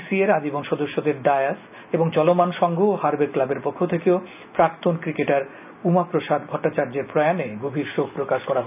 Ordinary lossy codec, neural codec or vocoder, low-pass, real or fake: none; none; 3.6 kHz; real